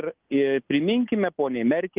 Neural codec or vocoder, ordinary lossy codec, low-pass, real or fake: none; Opus, 32 kbps; 3.6 kHz; real